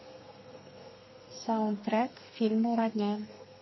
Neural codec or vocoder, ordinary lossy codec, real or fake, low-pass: codec, 32 kHz, 1.9 kbps, SNAC; MP3, 24 kbps; fake; 7.2 kHz